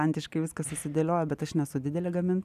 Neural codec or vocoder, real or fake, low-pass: none; real; 14.4 kHz